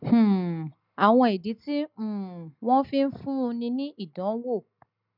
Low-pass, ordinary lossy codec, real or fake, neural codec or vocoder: 5.4 kHz; none; real; none